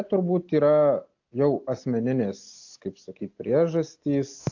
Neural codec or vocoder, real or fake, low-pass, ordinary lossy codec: none; real; 7.2 kHz; AAC, 48 kbps